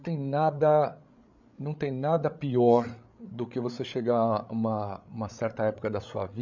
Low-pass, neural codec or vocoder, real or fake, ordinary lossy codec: 7.2 kHz; codec, 16 kHz, 8 kbps, FreqCodec, larger model; fake; none